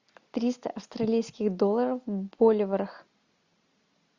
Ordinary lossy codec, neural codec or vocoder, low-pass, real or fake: Opus, 64 kbps; none; 7.2 kHz; real